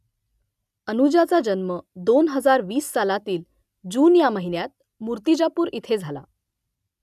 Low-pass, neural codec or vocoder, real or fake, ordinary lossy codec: 14.4 kHz; none; real; none